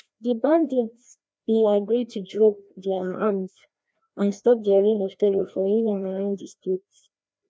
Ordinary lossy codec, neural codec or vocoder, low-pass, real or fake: none; codec, 16 kHz, 1 kbps, FreqCodec, larger model; none; fake